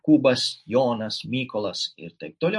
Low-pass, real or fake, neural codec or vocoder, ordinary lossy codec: 10.8 kHz; real; none; MP3, 48 kbps